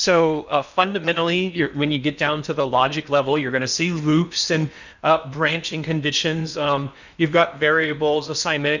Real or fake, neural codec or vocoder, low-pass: fake; codec, 16 kHz in and 24 kHz out, 0.8 kbps, FocalCodec, streaming, 65536 codes; 7.2 kHz